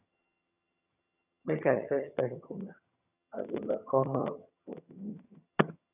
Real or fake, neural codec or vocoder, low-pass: fake; vocoder, 22.05 kHz, 80 mel bands, HiFi-GAN; 3.6 kHz